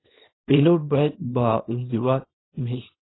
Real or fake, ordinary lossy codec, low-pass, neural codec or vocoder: fake; AAC, 16 kbps; 7.2 kHz; codec, 24 kHz, 0.9 kbps, WavTokenizer, small release